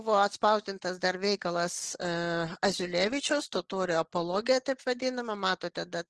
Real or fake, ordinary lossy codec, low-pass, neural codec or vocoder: real; Opus, 16 kbps; 10.8 kHz; none